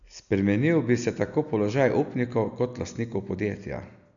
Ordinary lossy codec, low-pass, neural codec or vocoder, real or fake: none; 7.2 kHz; none; real